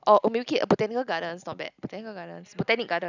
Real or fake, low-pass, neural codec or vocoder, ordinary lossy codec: real; 7.2 kHz; none; none